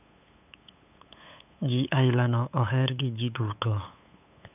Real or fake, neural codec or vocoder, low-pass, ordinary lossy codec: fake; codec, 16 kHz, 8 kbps, FunCodec, trained on LibriTTS, 25 frames a second; 3.6 kHz; none